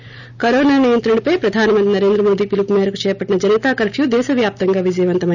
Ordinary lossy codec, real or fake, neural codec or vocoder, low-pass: none; real; none; 7.2 kHz